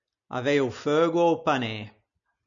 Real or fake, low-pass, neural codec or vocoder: real; 7.2 kHz; none